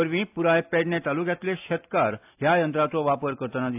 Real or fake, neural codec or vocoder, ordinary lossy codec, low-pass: real; none; AAC, 32 kbps; 3.6 kHz